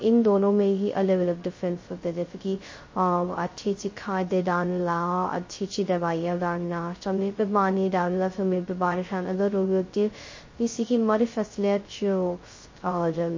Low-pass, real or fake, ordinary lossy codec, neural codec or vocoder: 7.2 kHz; fake; MP3, 32 kbps; codec, 16 kHz, 0.2 kbps, FocalCodec